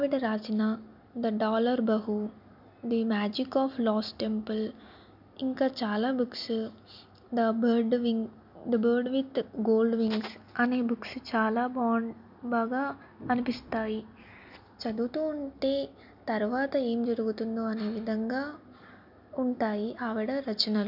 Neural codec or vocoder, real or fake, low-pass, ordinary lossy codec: none; real; 5.4 kHz; none